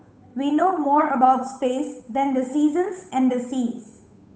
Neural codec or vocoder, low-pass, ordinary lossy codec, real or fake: codec, 16 kHz, 8 kbps, FunCodec, trained on Chinese and English, 25 frames a second; none; none; fake